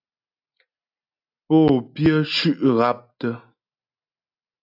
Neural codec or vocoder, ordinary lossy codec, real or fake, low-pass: none; AAC, 48 kbps; real; 5.4 kHz